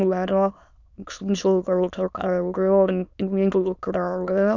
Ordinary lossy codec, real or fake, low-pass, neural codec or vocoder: none; fake; 7.2 kHz; autoencoder, 22.05 kHz, a latent of 192 numbers a frame, VITS, trained on many speakers